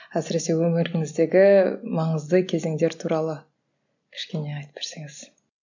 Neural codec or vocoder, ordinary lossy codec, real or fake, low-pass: none; none; real; 7.2 kHz